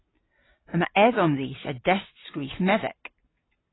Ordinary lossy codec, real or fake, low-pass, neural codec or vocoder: AAC, 16 kbps; real; 7.2 kHz; none